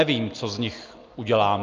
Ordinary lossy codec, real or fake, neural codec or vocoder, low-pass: Opus, 24 kbps; real; none; 7.2 kHz